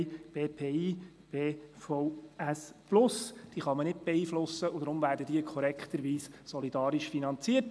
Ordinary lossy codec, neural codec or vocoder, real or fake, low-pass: none; none; real; none